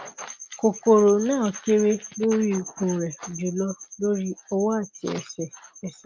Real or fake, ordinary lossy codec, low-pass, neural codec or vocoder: real; Opus, 32 kbps; 7.2 kHz; none